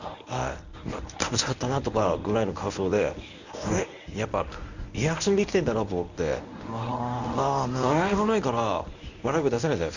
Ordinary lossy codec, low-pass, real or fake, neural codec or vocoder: none; 7.2 kHz; fake; codec, 24 kHz, 0.9 kbps, WavTokenizer, medium speech release version 1